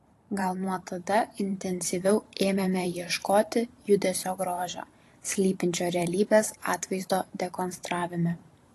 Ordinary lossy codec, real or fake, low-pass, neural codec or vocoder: AAC, 48 kbps; fake; 14.4 kHz; vocoder, 44.1 kHz, 128 mel bands every 512 samples, BigVGAN v2